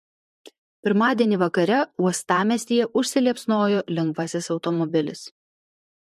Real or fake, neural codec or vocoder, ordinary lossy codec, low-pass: fake; vocoder, 44.1 kHz, 128 mel bands, Pupu-Vocoder; MP3, 64 kbps; 14.4 kHz